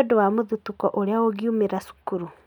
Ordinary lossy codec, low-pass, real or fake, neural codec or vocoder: none; 19.8 kHz; real; none